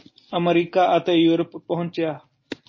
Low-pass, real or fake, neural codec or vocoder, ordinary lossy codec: 7.2 kHz; real; none; MP3, 32 kbps